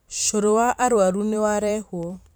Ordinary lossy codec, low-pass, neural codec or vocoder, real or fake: none; none; vocoder, 44.1 kHz, 128 mel bands every 512 samples, BigVGAN v2; fake